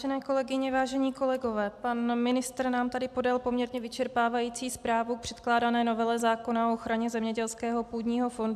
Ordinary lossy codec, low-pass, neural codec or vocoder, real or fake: AAC, 96 kbps; 14.4 kHz; none; real